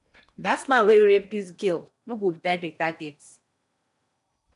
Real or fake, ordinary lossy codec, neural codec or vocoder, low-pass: fake; none; codec, 16 kHz in and 24 kHz out, 0.8 kbps, FocalCodec, streaming, 65536 codes; 10.8 kHz